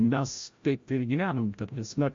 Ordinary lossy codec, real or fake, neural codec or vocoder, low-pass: MP3, 48 kbps; fake; codec, 16 kHz, 0.5 kbps, FreqCodec, larger model; 7.2 kHz